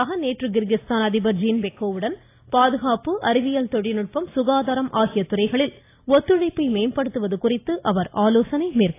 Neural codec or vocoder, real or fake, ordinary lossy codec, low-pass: none; real; AAC, 24 kbps; 3.6 kHz